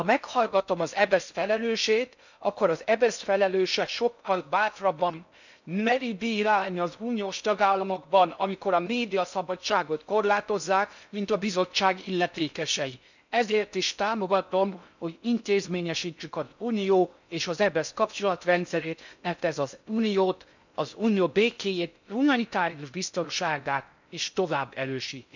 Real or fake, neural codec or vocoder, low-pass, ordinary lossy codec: fake; codec, 16 kHz in and 24 kHz out, 0.6 kbps, FocalCodec, streaming, 4096 codes; 7.2 kHz; none